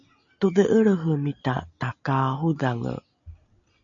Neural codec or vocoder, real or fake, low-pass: none; real; 7.2 kHz